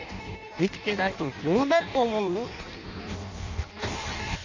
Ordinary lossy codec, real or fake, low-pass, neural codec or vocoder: none; fake; 7.2 kHz; codec, 16 kHz in and 24 kHz out, 0.6 kbps, FireRedTTS-2 codec